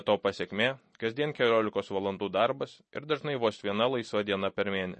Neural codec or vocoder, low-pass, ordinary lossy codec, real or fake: none; 9.9 kHz; MP3, 32 kbps; real